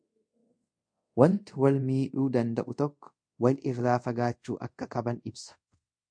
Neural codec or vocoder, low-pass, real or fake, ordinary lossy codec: codec, 24 kHz, 0.5 kbps, DualCodec; 9.9 kHz; fake; MP3, 48 kbps